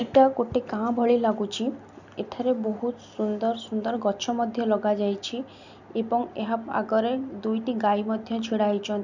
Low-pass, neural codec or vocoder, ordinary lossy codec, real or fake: 7.2 kHz; none; none; real